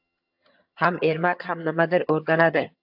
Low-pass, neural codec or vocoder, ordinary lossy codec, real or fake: 5.4 kHz; vocoder, 22.05 kHz, 80 mel bands, HiFi-GAN; AAC, 48 kbps; fake